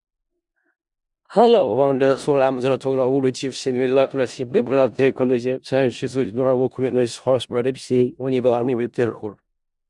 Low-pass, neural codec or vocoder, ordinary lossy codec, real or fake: 10.8 kHz; codec, 16 kHz in and 24 kHz out, 0.4 kbps, LongCat-Audio-Codec, four codebook decoder; Opus, 64 kbps; fake